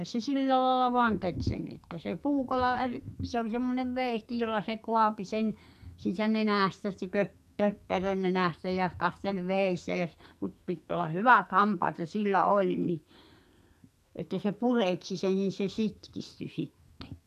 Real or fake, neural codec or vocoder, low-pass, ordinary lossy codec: fake; codec, 44.1 kHz, 2.6 kbps, SNAC; 14.4 kHz; none